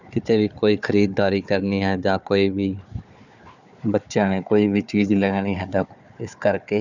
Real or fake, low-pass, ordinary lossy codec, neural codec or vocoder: fake; 7.2 kHz; none; codec, 16 kHz, 4 kbps, FunCodec, trained on Chinese and English, 50 frames a second